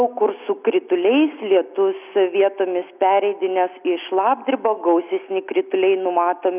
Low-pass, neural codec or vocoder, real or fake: 3.6 kHz; none; real